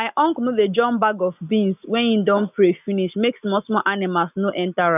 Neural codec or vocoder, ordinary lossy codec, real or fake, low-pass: none; none; real; 3.6 kHz